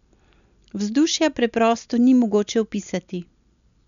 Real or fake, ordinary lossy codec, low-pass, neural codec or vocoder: real; none; 7.2 kHz; none